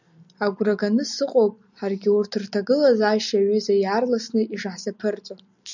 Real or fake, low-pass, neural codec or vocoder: real; 7.2 kHz; none